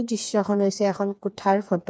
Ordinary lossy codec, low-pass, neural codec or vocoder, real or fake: none; none; codec, 16 kHz, 4 kbps, FreqCodec, smaller model; fake